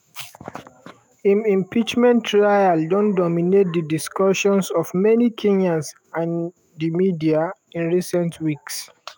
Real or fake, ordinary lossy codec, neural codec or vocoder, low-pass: fake; none; autoencoder, 48 kHz, 128 numbers a frame, DAC-VAE, trained on Japanese speech; none